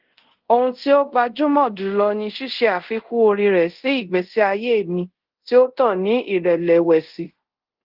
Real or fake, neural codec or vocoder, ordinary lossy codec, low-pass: fake; codec, 24 kHz, 0.5 kbps, DualCodec; Opus, 16 kbps; 5.4 kHz